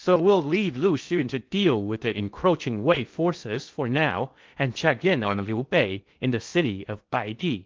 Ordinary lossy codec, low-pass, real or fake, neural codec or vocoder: Opus, 32 kbps; 7.2 kHz; fake; codec, 16 kHz in and 24 kHz out, 0.6 kbps, FocalCodec, streaming, 2048 codes